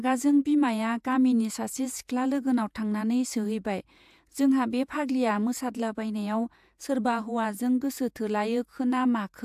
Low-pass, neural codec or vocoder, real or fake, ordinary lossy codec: 14.4 kHz; vocoder, 48 kHz, 128 mel bands, Vocos; fake; AAC, 96 kbps